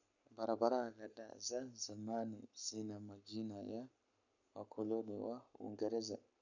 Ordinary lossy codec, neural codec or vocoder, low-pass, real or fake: none; codec, 44.1 kHz, 7.8 kbps, Pupu-Codec; 7.2 kHz; fake